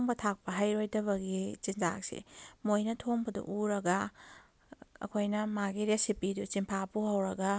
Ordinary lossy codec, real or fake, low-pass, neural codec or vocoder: none; real; none; none